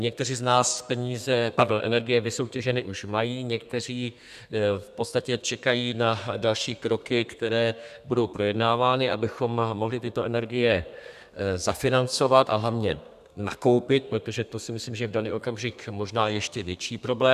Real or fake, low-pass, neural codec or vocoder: fake; 14.4 kHz; codec, 32 kHz, 1.9 kbps, SNAC